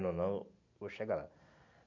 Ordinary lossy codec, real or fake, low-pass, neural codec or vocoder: none; real; 7.2 kHz; none